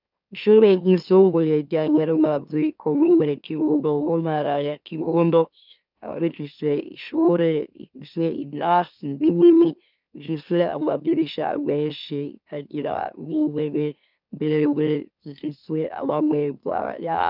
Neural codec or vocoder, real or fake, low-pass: autoencoder, 44.1 kHz, a latent of 192 numbers a frame, MeloTTS; fake; 5.4 kHz